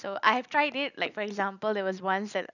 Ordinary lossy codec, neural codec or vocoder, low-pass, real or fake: none; none; 7.2 kHz; real